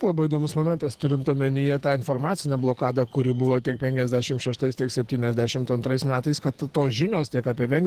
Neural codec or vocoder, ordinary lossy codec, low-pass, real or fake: codec, 44.1 kHz, 2.6 kbps, SNAC; Opus, 24 kbps; 14.4 kHz; fake